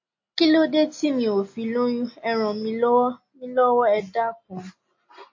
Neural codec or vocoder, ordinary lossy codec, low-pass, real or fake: none; MP3, 32 kbps; 7.2 kHz; real